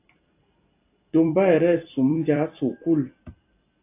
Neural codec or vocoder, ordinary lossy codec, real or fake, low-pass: vocoder, 44.1 kHz, 128 mel bands every 256 samples, BigVGAN v2; AAC, 24 kbps; fake; 3.6 kHz